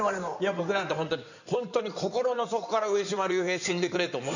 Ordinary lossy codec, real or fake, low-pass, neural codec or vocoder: none; fake; 7.2 kHz; codec, 16 kHz in and 24 kHz out, 2.2 kbps, FireRedTTS-2 codec